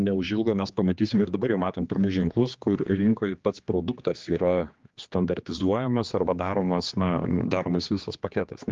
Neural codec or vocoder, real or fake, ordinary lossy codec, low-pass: codec, 16 kHz, 2 kbps, X-Codec, HuBERT features, trained on general audio; fake; Opus, 32 kbps; 7.2 kHz